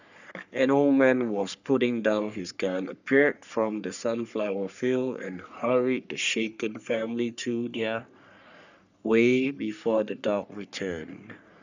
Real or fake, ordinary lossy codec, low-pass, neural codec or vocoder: fake; none; 7.2 kHz; codec, 44.1 kHz, 3.4 kbps, Pupu-Codec